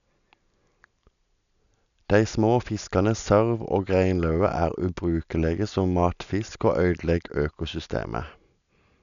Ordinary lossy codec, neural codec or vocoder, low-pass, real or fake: none; none; 7.2 kHz; real